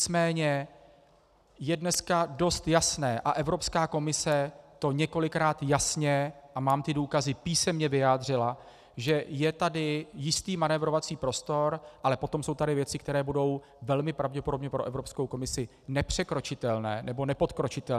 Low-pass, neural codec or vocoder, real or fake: 14.4 kHz; none; real